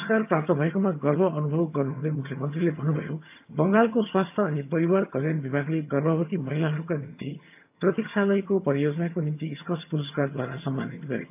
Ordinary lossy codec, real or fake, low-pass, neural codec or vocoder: AAC, 32 kbps; fake; 3.6 kHz; vocoder, 22.05 kHz, 80 mel bands, HiFi-GAN